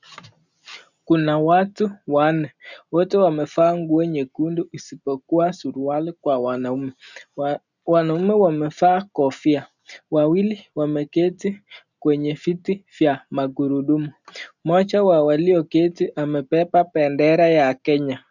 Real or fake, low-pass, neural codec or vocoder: real; 7.2 kHz; none